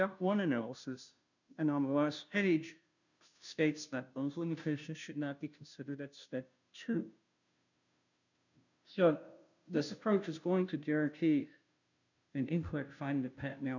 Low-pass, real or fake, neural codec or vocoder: 7.2 kHz; fake; codec, 16 kHz, 0.5 kbps, FunCodec, trained on Chinese and English, 25 frames a second